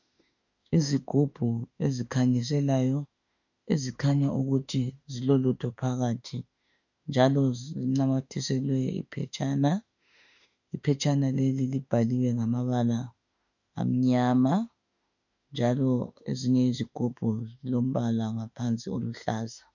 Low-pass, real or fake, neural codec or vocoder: 7.2 kHz; fake; autoencoder, 48 kHz, 32 numbers a frame, DAC-VAE, trained on Japanese speech